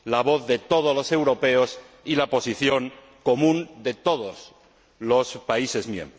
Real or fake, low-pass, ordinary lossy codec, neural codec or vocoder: real; none; none; none